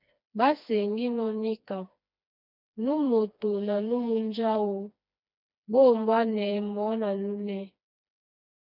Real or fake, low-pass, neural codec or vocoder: fake; 5.4 kHz; codec, 16 kHz, 2 kbps, FreqCodec, smaller model